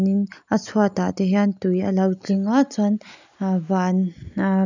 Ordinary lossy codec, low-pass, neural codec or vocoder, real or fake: none; 7.2 kHz; none; real